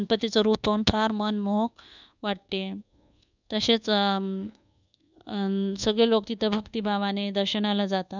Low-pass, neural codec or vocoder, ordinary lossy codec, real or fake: 7.2 kHz; codec, 24 kHz, 1.2 kbps, DualCodec; none; fake